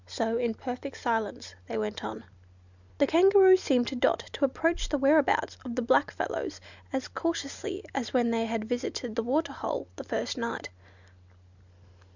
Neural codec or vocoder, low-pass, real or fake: none; 7.2 kHz; real